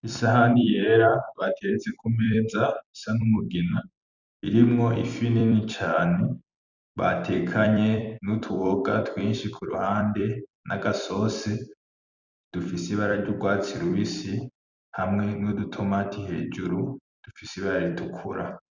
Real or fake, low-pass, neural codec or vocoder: fake; 7.2 kHz; vocoder, 44.1 kHz, 128 mel bands every 256 samples, BigVGAN v2